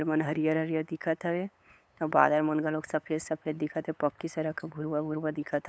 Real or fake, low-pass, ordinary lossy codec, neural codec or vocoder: fake; none; none; codec, 16 kHz, 8 kbps, FunCodec, trained on LibriTTS, 25 frames a second